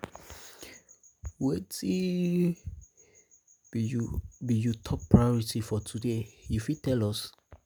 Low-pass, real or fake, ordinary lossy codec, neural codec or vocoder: none; real; none; none